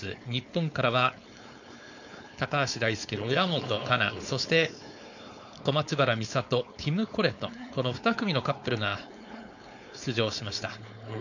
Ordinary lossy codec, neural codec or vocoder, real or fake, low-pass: none; codec, 16 kHz, 4.8 kbps, FACodec; fake; 7.2 kHz